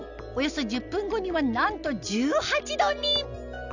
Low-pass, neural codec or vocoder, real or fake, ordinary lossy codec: 7.2 kHz; none; real; none